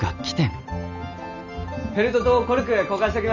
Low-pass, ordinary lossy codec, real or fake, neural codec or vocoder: 7.2 kHz; none; real; none